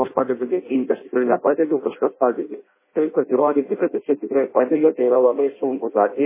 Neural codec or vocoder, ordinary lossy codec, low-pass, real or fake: codec, 16 kHz in and 24 kHz out, 0.6 kbps, FireRedTTS-2 codec; MP3, 16 kbps; 3.6 kHz; fake